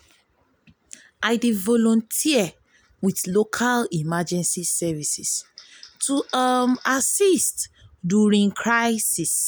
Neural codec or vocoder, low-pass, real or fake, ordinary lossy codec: none; none; real; none